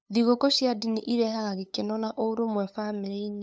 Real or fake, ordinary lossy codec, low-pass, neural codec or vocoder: fake; none; none; codec, 16 kHz, 8 kbps, FunCodec, trained on LibriTTS, 25 frames a second